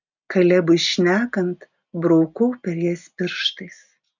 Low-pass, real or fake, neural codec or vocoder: 7.2 kHz; real; none